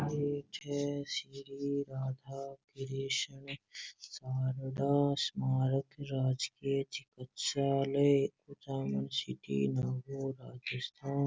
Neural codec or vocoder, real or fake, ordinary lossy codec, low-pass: none; real; none; none